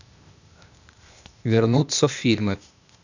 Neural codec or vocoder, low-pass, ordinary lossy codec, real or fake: codec, 16 kHz, 0.8 kbps, ZipCodec; 7.2 kHz; none; fake